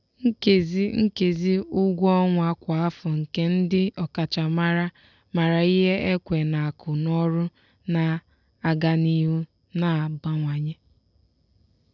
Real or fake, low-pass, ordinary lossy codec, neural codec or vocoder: real; 7.2 kHz; none; none